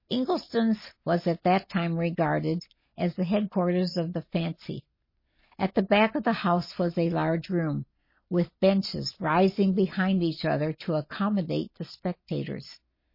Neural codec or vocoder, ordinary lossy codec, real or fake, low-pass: none; MP3, 24 kbps; real; 5.4 kHz